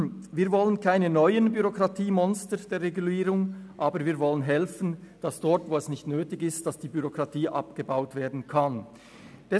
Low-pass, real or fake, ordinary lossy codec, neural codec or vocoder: none; real; none; none